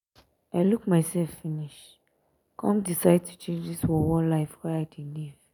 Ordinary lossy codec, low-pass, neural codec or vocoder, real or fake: none; 19.8 kHz; none; real